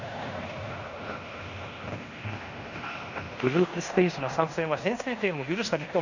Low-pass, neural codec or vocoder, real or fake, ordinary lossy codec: 7.2 kHz; codec, 16 kHz in and 24 kHz out, 0.9 kbps, LongCat-Audio-Codec, fine tuned four codebook decoder; fake; none